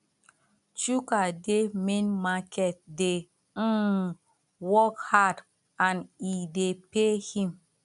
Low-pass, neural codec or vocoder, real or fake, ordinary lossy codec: 10.8 kHz; none; real; none